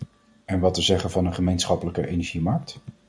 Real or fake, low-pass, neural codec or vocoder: real; 9.9 kHz; none